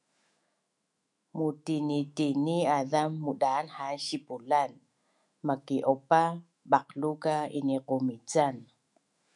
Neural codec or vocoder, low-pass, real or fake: autoencoder, 48 kHz, 128 numbers a frame, DAC-VAE, trained on Japanese speech; 10.8 kHz; fake